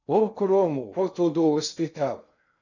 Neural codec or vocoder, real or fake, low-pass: codec, 16 kHz in and 24 kHz out, 0.6 kbps, FocalCodec, streaming, 2048 codes; fake; 7.2 kHz